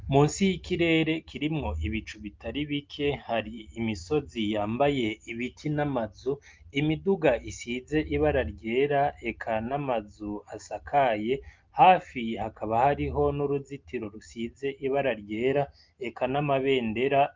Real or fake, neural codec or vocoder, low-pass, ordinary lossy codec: real; none; 7.2 kHz; Opus, 32 kbps